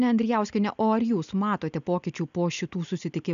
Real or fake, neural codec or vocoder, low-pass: real; none; 7.2 kHz